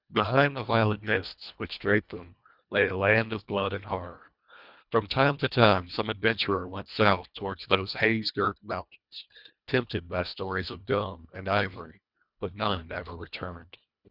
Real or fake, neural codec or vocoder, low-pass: fake; codec, 24 kHz, 1.5 kbps, HILCodec; 5.4 kHz